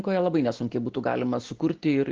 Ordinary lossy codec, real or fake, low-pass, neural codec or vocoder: Opus, 16 kbps; real; 7.2 kHz; none